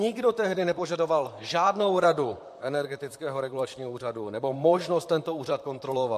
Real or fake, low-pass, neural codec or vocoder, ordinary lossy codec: fake; 14.4 kHz; vocoder, 44.1 kHz, 128 mel bands, Pupu-Vocoder; MP3, 64 kbps